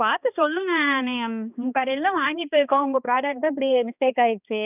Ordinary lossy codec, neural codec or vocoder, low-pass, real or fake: none; codec, 16 kHz, 2 kbps, X-Codec, HuBERT features, trained on balanced general audio; 3.6 kHz; fake